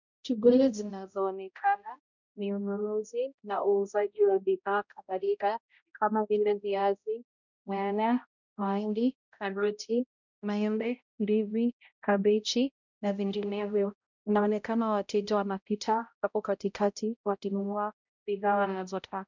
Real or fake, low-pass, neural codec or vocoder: fake; 7.2 kHz; codec, 16 kHz, 0.5 kbps, X-Codec, HuBERT features, trained on balanced general audio